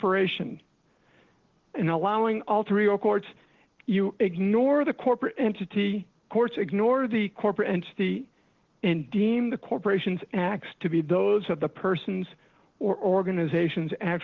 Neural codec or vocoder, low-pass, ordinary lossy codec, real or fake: none; 7.2 kHz; Opus, 16 kbps; real